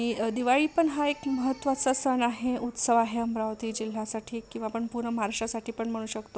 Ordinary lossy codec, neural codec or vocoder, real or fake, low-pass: none; none; real; none